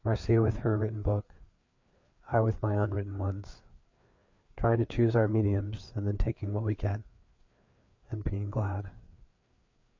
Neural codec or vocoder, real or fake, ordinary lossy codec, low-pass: codec, 16 kHz, 4 kbps, FreqCodec, larger model; fake; MP3, 48 kbps; 7.2 kHz